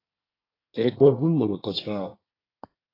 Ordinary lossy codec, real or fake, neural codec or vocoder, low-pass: AAC, 24 kbps; fake; codec, 24 kHz, 1 kbps, SNAC; 5.4 kHz